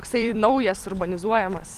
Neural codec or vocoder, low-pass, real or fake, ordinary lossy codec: vocoder, 44.1 kHz, 128 mel bands every 256 samples, BigVGAN v2; 14.4 kHz; fake; Opus, 24 kbps